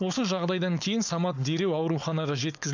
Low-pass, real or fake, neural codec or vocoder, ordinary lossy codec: 7.2 kHz; fake; codec, 16 kHz, 4.8 kbps, FACodec; none